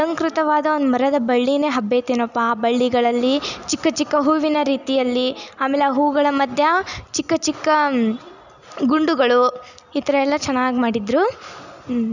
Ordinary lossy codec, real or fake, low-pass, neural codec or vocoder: none; real; 7.2 kHz; none